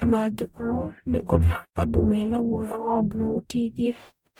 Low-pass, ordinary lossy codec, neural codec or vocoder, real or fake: 19.8 kHz; none; codec, 44.1 kHz, 0.9 kbps, DAC; fake